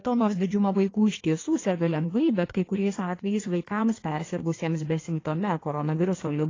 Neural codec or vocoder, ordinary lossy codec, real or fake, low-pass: codec, 16 kHz in and 24 kHz out, 1.1 kbps, FireRedTTS-2 codec; AAC, 32 kbps; fake; 7.2 kHz